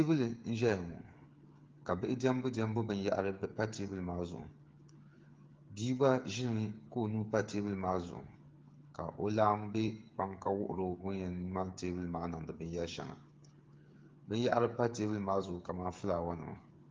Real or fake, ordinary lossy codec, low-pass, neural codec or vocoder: fake; Opus, 32 kbps; 7.2 kHz; codec, 16 kHz, 8 kbps, FreqCodec, smaller model